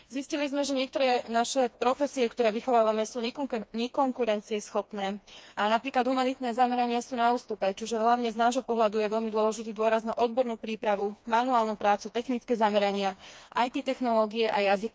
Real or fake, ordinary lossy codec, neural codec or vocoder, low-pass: fake; none; codec, 16 kHz, 2 kbps, FreqCodec, smaller model; none